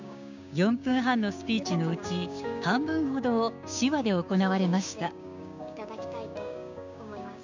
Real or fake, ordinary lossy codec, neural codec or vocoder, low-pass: fake; none; codec, 16 kHz, 6 kbps, DAC; 7.2 kHz